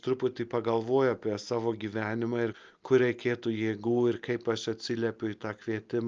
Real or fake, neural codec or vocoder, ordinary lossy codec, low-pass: real; none; Opus, 24 kbps; 7.2 kHz